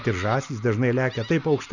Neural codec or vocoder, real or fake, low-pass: none; real; 7.2 kHz